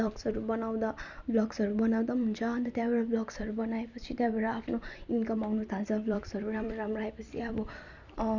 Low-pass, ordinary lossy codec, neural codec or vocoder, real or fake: 7.2 kHz; none; vocoder, 44.1 kHz, 128 mel bands every 256 samples, BigVGAN v2; fake